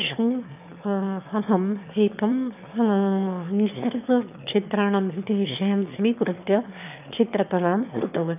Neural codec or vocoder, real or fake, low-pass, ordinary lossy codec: autoencoder, 22.05 kHz, a latent of 192 numbers a frame, VITS, trained on one speaker; fake; 3.6 kHz; none